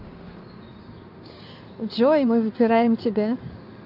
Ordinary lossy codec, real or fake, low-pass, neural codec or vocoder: none; fake; 5.4 kHz; codec, 16 kHz in and 24 kHz out, 2.2 kbps, FireRedTTS-2 codec